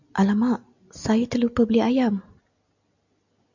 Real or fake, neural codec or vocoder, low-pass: real; none; 7.2 kHz